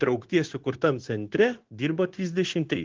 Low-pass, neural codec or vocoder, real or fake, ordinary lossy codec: 7.2 kHz; codec, 16 kHz, 0.9 kbps, LongCat-Audio-Codec; fake; Opus, 16 kbps